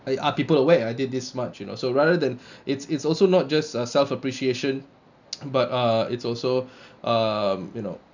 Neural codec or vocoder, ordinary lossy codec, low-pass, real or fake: none; none; 7.2 kHz; real